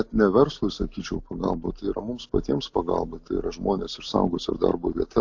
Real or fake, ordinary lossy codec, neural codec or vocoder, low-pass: real; AAC, 48 kbps; none; 7.2 kHz